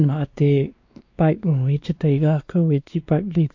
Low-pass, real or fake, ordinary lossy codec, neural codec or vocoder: 7.2 kHz; fake; AAC, 48 kbps; codec, 16 kHz, 2 kbps, X-Codec, WavLM features, trained on Multilingual LibriSpeech